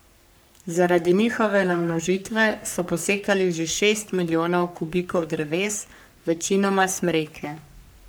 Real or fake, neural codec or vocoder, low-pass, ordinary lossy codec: fake; codec, 44.1 kHz, 3.4 kbps, Pupu-Codec; none; none